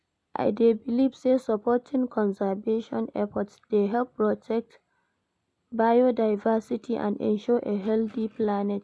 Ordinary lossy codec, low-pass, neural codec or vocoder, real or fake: none; none; none; real